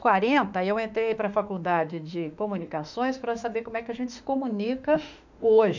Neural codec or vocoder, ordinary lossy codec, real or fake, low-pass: autoencoder, 48 kHz, 32 numbers a frame, DAC-VAE, trained on Japanese speech; none; fake; 7.2 kHz